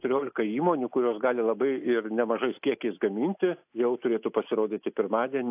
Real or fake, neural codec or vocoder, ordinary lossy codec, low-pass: real; none; AAC, 32 kbps; 3.6 kHz